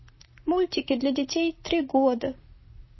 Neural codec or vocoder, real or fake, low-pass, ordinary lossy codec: vocoder, 44.1 kHz, 128 mel bands, Pupu-Vocoder; fake; 7.2 kHz; MP3, 24 kbps